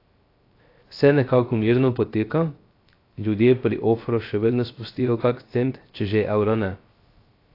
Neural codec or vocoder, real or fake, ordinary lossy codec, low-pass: codec, 16 kHz, 0.3 kbps, FocalCodec; fake; AAC, 32 kbps; 5.4 kHz